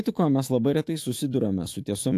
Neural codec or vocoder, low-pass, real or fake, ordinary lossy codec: autoencoder, 48 kHz, 128 numbers a frame, DAC-VAE, trained on Japanese speech; 14.4 kHz; fake; AAC, 64 kbps